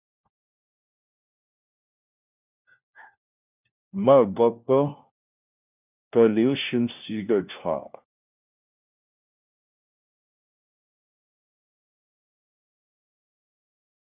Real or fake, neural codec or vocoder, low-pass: fake; codec, 16 kHz, 1 kbps, FunCodec, trained on LibriTTS, 50 frames a second; 3.6 kHz